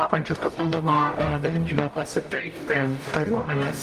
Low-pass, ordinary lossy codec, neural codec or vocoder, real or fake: 14.4 kHz; Opus, 32 kbps; codec, 44.1 kHz, 0.9 kbps, DAC; fake